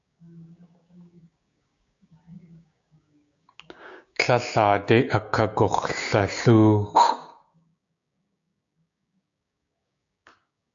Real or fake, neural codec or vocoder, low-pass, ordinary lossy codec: fake; codec, 16 kHz, 6 kbps, DAC; 7.2 kHz; AAC, 64 kbps